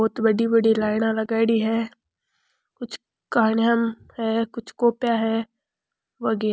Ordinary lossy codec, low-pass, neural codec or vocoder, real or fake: none; none; none; real